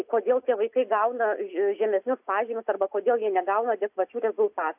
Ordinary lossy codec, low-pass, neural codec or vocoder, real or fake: AAC, 32 kbps; 3.6 kHz; none; real